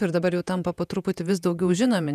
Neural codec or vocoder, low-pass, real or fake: vocoder, 48 kHz, 128 mel bands, Vocos; 14.4 kHz; fake